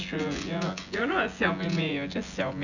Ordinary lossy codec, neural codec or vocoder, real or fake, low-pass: none; vocoder, 24 kHz, 100 mel bands, Vocos; fake; 7.2 kHz